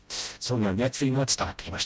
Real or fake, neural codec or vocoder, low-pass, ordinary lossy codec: fake; codec, 16 kHz, 0.5 kbps, FreqCodec, smaller model; none; none